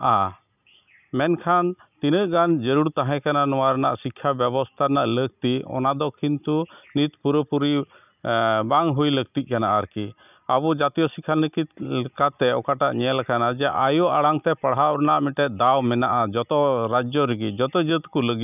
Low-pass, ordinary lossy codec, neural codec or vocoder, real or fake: 3.6 kHz; none; none; real